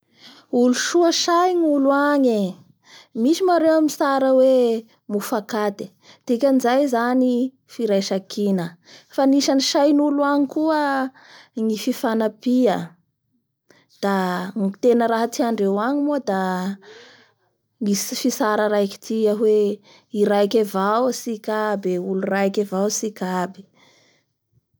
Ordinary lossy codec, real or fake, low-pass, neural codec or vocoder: none; real; none; none